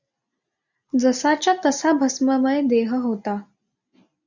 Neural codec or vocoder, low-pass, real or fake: none; 7.2 kHz; real